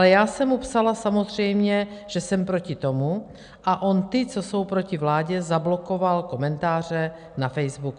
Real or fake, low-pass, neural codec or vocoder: real; 9.9 kHz; none